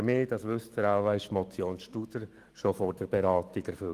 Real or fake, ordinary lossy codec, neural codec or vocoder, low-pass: fake; Opus, 24 kbps; autoencoder, 48 kHz, 128 numbers a frame, DAC-VAE, trained on Japanese speech; 14.4 kHz